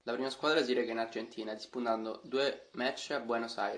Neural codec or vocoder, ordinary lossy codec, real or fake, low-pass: none; AAC, 48 kbps; real; 9.9 kHz